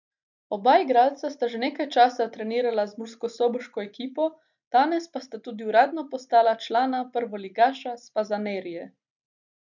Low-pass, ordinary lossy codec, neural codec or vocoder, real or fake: 7.2 kHz; none; none; real